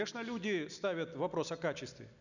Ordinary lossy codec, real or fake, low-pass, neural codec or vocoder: none; real; 7.2 kHz; none